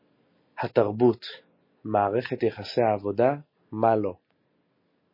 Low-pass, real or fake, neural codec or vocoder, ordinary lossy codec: 5.4 kHz; real; none; MP3, 24 kbps